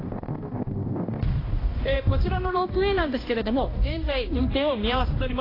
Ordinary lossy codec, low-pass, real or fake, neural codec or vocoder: AAC, 24 kbps; 5.4 kHz; fake; codec, 16 kHz, 1 kbps, X-Codec, HuBERT features, trained on balanced general audio